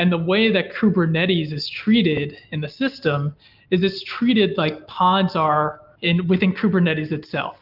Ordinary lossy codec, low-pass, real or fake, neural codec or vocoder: Opus, 24 kbps; 5.4 kHz; real; none